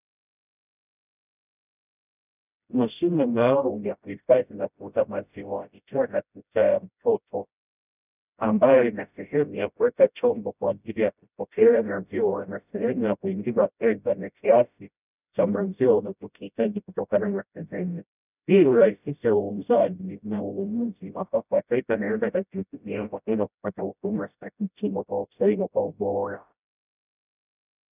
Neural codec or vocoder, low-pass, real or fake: codec, 16 kHz, 0.5 kbps, FreqCodec, smaller model; 3.6 kHz; fake